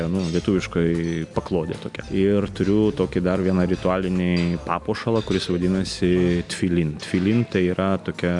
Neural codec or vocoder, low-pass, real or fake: none; 10.8 kHz; real